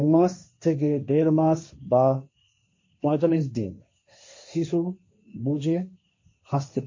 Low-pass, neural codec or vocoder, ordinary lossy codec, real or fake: 7.2 kHz; codec, 16 kHz, 1.1 kbps, Voila-Tokenizer; MP3, 32 kbps; fake